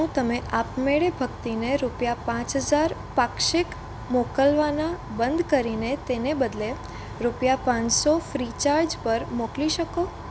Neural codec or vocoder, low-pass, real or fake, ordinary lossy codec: none; none; real; none